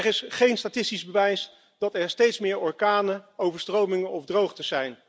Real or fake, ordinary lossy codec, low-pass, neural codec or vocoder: real; none; none; none